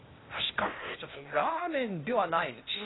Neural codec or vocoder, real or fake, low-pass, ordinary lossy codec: codec, 16 kHz, 0.8 kbps, ZipCodec; fake; 7.2 kHz; AAC, 16 kbps